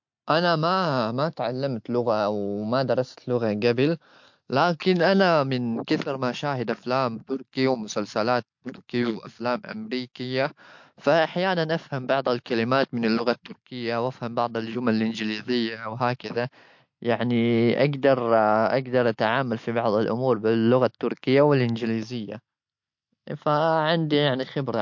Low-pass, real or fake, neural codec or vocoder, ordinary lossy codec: 7.2 kHz; real; none; MP3, 48 kbps